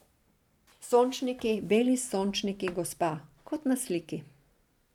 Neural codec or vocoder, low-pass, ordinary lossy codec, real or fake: vocoder, 44.1 kHz, 128 mel bands every 512 samples, BigVGAN v2; 19.8 kHz; none; fake